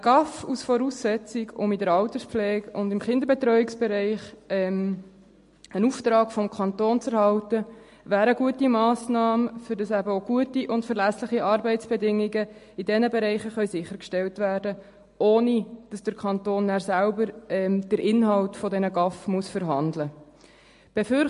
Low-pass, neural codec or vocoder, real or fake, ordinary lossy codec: 10.8 kHz; none; real; MP3, 48 kbps